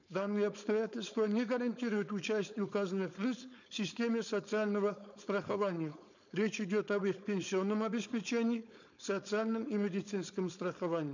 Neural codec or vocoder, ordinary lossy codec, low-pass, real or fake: codec, 16 kHz, 4.8 kbps, FACodec; none; 7.2 kHz; fake